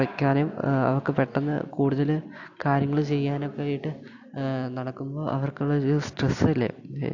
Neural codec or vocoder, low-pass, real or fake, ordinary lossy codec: none; 7.2 kHz; real; AAC, 48 kbps